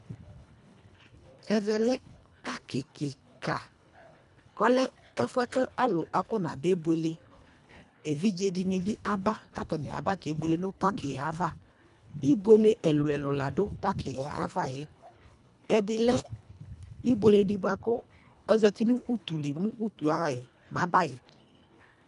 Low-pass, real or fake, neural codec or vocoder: 10.8 kHz; fake; codec, 24 kHz, 1.5 kbps, HILCodec